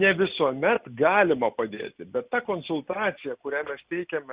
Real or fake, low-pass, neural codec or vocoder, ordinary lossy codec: real; 3.6 kHz; none; Opus, 64 kbps